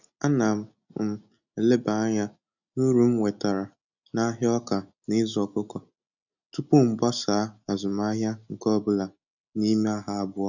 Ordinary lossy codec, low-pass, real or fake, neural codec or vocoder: none; 7.2 kHz; real; none